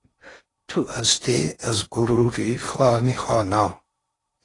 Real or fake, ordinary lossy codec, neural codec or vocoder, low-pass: fake; AAC, 32 kbps; codec, 16 kHz in and 24 kHz out, 0.6 kbps, FocalCodec, streaming, 4096 codes; 10.8 kHz